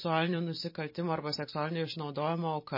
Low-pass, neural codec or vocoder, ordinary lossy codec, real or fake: 5.4 kHz; none; MP3, 24 kbps; real